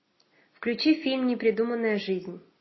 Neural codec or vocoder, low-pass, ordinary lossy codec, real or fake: none; 7.2 kHz; MP3, 24 kbps; real